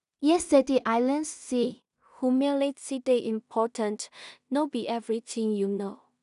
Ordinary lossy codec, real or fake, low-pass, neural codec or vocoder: none; fake; 10.8 kHz; codec, 16 kHz in and 24 kHz out, 0.4 kbps, LongCat-Audio-Codec, two codebook decoder